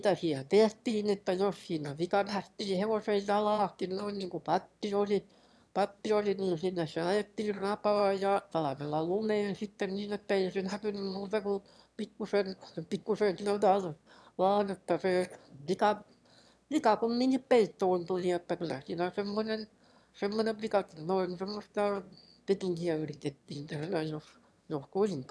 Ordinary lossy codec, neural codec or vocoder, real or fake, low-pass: none; autoencoder, 22.05 kHz, a latent of 192 numbers a frame, VITS, trained on one speaker; fake; none